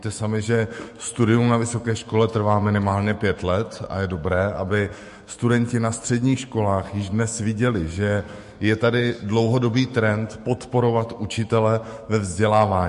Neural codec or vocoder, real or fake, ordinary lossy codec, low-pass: autoencoder, 48 kHz, 128 numbers a frame, DAC-VAE, trained on Japanese speech; fake; MP3, 48 kbps; 14.4 kHz